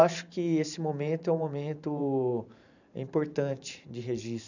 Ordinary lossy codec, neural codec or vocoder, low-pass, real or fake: none; vocoder, 44.1 kHz, 128 mel bands every 512 samples, BigVGAN v2; 7.2 kHz; fake